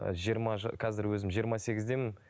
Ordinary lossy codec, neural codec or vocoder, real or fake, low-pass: none; none; real; none